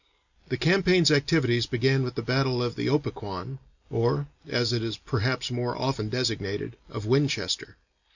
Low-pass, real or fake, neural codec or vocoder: 7.2 kHz; real; none